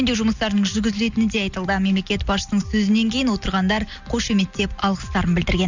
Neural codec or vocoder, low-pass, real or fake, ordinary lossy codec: none; 7.2 kHz; real; Opus, 64 kbps